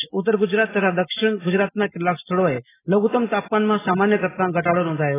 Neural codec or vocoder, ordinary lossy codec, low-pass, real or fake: none; AAC, 16 kbps; 3.6 kHz; real